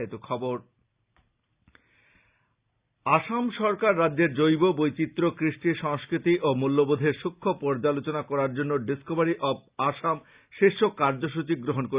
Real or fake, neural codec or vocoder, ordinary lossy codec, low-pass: real; none; Opus, 64 kbps; 3.6 kHz